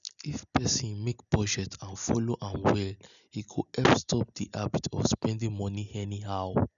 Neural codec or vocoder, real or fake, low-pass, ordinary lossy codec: none; real; 7.2 kHz; none